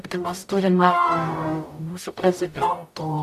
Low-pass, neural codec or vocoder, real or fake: 14.4 kHz; codec, 44.1 kHz, 0.9 kbps, DAC; fake